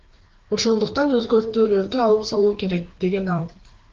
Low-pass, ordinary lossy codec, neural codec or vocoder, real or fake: 7.2 kHz; Opus, 16 kbps; codec, 16 kHz, 2 kbps, FreqCodec, larger model; fake